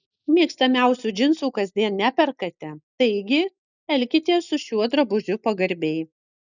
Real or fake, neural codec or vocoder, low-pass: real; none; 7.2 kHz